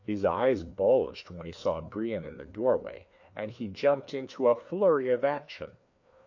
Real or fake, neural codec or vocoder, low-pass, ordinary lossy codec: fake; codec, 16 kHz, 2 kbps, FreqCodec, larger model; 7.2 kHz; AAC, 48 kbps